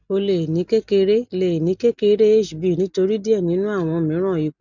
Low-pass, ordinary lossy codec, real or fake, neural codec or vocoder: 7.2 kHz; none; real; none